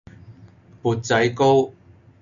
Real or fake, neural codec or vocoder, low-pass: real; none; 7.2 kHz